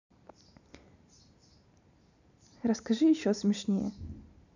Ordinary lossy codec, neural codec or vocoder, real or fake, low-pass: none; none; real; 7.2 kHz